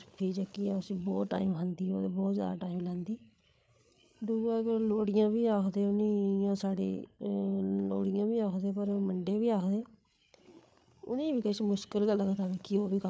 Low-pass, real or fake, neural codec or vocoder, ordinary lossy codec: none; fake; codec, 16 kHz, 8 kbps, FreqCodec, larger model; none